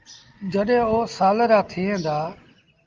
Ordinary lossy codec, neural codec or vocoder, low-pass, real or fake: Opus, 32 kbps; none; 7.2 kHz; real